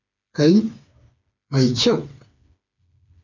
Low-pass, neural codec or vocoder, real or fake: 7.2 kHz; codec, 16 kHz, 4 kbps, FreqCodec, smaller model; fake